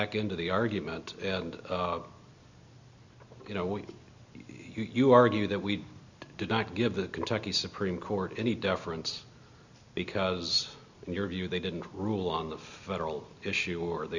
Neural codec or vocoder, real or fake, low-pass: none; real; 7.2 kHz